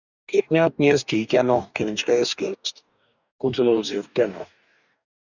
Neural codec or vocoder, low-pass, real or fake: codec, 44.1 kHz, 2.6 kbps, DAC; 7.2 kHz; fake